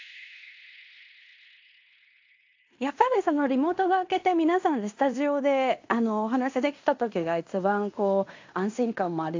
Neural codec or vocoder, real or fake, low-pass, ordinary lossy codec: codec, 16 kHz in and 24 kHz out, 0.9 kbps, LongCat-Audio-Codec, fine tuned four codebook decoder; fake; 7.2 kHz; AAC, 48 kbps